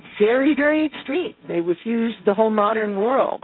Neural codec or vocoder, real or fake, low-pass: codec, 16 kHz, 1.1 kbps, Voila-Tokenizer; fake; 5.4 kHz